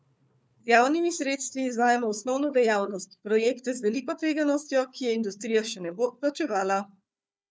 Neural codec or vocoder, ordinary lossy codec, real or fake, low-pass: codec, 16 kHz, 4 kbps, FunCodec, trained on Chinese and English, 50 frames a second; none; fake; none